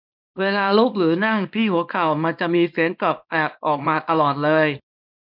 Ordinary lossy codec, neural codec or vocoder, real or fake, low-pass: none; codec, 24 kHz, 0.9 kbps, WavTokenizer, small release; fake; 5.4 kHz